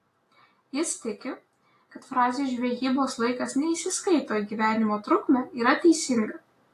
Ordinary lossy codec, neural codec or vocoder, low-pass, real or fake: AAC, 48 kbps; none; 14.4 kHz; real